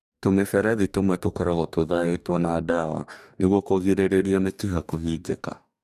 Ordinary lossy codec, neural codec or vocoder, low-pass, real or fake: none; codec, 44.1 kHz, 2.6 kbps, DAC; 14.4 kHz; fake